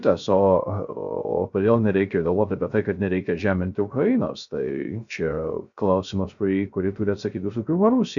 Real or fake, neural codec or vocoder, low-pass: fake; codec, 16 kHz, 0.3 kbps, FocalCodec; 7.2 kHz